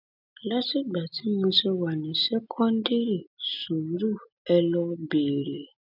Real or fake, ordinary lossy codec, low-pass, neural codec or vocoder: real; none; 5.4 kHz; none